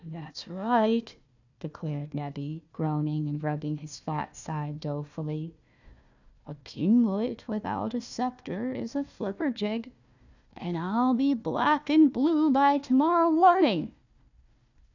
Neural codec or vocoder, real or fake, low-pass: codec, 16 kHz, 1 kbps, FunCodec, trained on Chinese and English, 50 frames a second; fake; 7.2 kHz